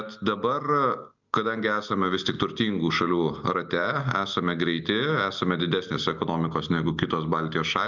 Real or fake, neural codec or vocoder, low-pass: real; none; 7.2 kHz